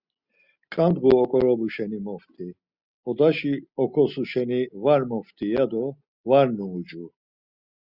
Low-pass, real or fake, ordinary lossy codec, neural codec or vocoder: 5.4 kHz; real; Opus, 64 kbps; none